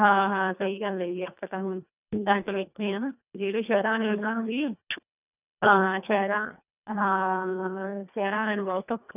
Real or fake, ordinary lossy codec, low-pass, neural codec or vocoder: fake; none; 3.6 kHz; codec, 24 kHz, 1.5 kbps, HILCodec